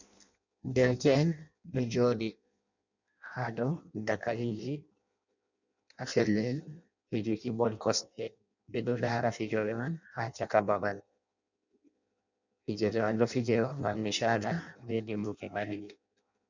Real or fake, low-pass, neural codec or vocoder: fake; 7.2 kHz; codec, 16 kHz in and 24 kHz out, 0.6 kbps, FireRedTTS-2 codec